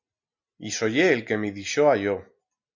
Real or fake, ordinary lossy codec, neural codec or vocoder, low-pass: real; MP3, 64 kbps; none; 7.2 kHz